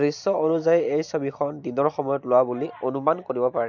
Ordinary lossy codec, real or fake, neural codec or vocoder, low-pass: none; real; none; 7.2 kHz